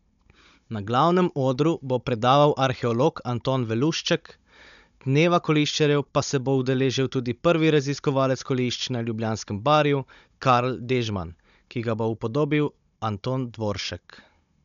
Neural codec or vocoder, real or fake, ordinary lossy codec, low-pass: codec, 16 kHz, 16 kbps, FunCodec, trained on Chinese and English, 50 frames a second; fake; none; 7.2 kHz